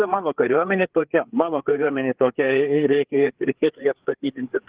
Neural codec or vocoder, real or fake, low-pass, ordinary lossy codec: codec, 16 kHz, 4 kbps, FreqCodec, larger model; fake; 3.6 kHz; Opus, 16 kbps